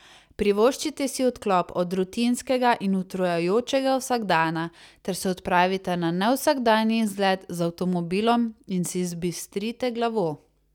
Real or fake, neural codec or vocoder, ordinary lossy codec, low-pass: real; none; none; 19.8 kHz